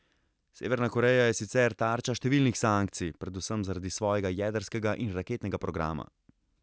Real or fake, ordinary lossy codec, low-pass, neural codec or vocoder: real; none; none; none